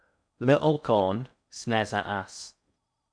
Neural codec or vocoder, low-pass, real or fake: codec, 16 kHz in and 24 kHz out, 0.6 kbps, FocalCodec, streaming, 4096 codes; 9.9 kHz; fake